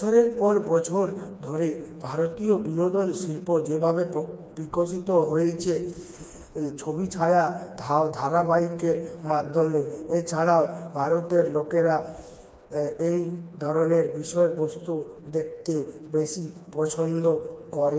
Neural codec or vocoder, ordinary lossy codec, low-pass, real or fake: codec, 16 kHz, 2 kbps, FreqCodec, smaller model; none; none; fake